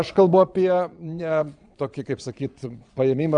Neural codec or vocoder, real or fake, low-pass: vocoder, 22.05 kHz, 80 mel bands, Vocos; fake; 9.9 kHz